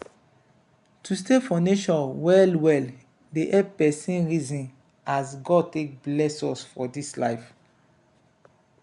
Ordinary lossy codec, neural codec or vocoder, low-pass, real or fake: none; none; 10.8 kHz; real